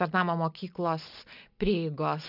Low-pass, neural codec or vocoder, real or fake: 5.4 kHz; none; real